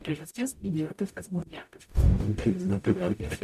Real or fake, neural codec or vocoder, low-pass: fake; codec, 44.1 kHz, 0.9 kbps, DAC; 14.4 kHz